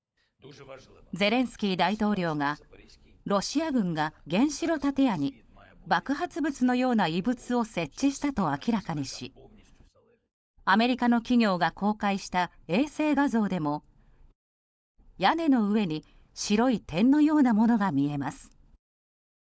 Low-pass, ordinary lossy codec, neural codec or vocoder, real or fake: none; none; codec, 16 kHz, 16 kbps, FunCodec, trained on LibriTTS, 50 frames a second; fake